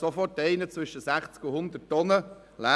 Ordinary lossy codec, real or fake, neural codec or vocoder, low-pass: none; real; none; none